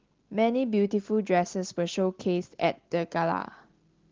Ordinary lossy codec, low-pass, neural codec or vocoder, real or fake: Opus, 16 kbps; 7.2 kHz; none; real